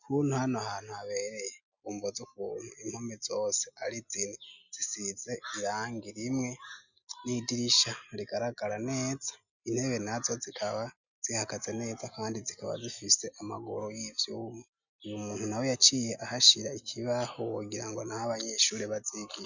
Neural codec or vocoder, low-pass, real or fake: none; 7.2 kHz; real